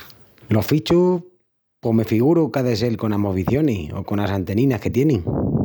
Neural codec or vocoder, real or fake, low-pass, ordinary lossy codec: none; real; none; none